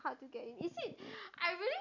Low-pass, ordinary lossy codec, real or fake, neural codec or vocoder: 7.2 kHz; none; real; none